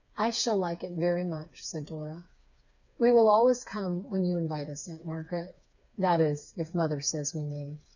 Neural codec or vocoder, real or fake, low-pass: codec, 16 kHz, 4 kbps, FreqCodec, smaller model; fake; 7.2 kHz